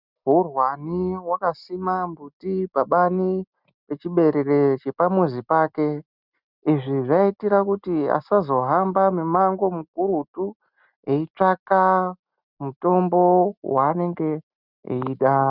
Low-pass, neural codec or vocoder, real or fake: 5.4 kHz; none; real